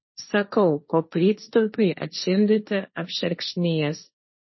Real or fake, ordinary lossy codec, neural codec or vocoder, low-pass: fake; MP3, 24 kbps; codec, 16 kHz, 1.1 kbps, Voila-Tokenizer; 7.2 kHz